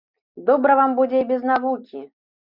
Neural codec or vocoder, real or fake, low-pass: none; real; 5.4 kHz